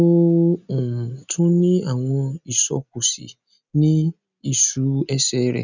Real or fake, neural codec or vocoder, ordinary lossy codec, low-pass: real; none; none; 7.2 kHz